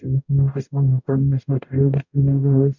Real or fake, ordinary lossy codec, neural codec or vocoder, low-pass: fake; none; codec, 44.1 kHz, 0.9 kbps, DAC; 7.2 kHz